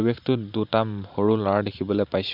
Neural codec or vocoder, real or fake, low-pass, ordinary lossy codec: vocoder, 44.1 kHz, 128 mel bands every 512 samples, BigVGAN v2; fake; 5.4 kHz; none